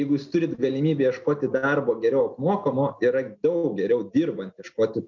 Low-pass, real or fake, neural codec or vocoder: 7.2 kHz; real; none